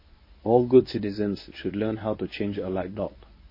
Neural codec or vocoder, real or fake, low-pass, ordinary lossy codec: codec, 24 kHz, 0.9 kbps, WavTokenizer, medium speech release version 1; fake; 5.4 kHz; MP3, 24 kbps